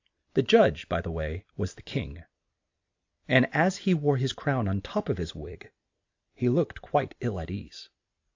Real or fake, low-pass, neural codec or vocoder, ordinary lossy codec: real; 7.2 kHz; none; AAC, 48 kbps